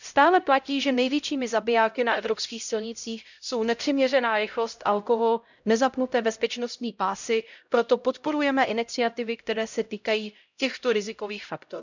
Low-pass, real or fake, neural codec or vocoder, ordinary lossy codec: 7.2 kHz; fake; codec, 16 kHz, 0.5 kbps, X-Codec, HuBERT features, trained on LibriSpeech; none